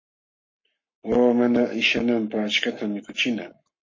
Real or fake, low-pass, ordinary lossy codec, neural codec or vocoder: fake; 7.2 kHz; MP3, 32 kbps; codec, 44.1 kHz, 7.8 kbps, Pupu-Codec